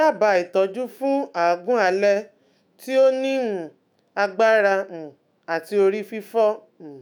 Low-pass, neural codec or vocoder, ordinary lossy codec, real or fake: none; autoencoder, 48 kHz, 128 numbers a frame, DAC-VAE, trained on Japanese speech; none; fake